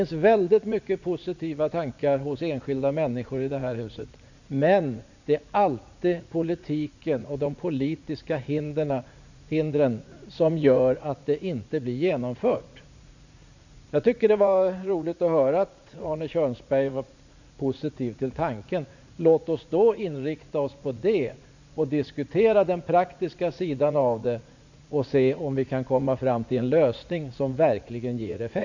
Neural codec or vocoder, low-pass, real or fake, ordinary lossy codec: vocoder, 44.1 kHz, 80 mel bands, Vocos; 7.2 kHz; fake; none